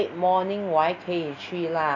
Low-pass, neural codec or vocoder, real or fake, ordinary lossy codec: 7.2 kHz; none; real; none